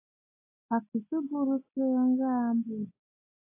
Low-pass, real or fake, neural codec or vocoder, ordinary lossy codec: 3.6 kHz; real; none; AAC, 24 kbps